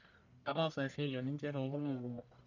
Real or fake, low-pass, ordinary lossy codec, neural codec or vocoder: fake; 7.2 kHz; none; codec, 44.1 kHz, 1.7 kbps, Pupu-Codec